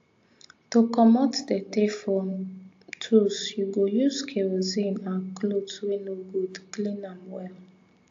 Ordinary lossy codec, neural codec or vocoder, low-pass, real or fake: AAC, 48 kbps; none; 7.2 kHz; real